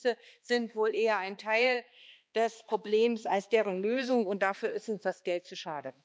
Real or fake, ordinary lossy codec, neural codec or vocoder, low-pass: fake; none; codec, 16 kHz, 2 kbps, X-Codec, HuBERT features, trained on balanced general audio; none